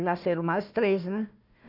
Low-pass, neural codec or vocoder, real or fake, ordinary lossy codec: 5.4 kHz; codec, 16 kHz, 1 kbps, FunCodec, trained on Chinese and English, 50 frames a second; fake; none